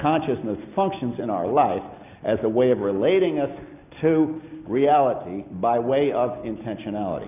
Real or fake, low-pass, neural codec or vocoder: real; 3.6 kHz; none